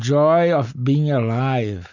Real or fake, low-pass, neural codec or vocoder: real; 7.2 kHz; none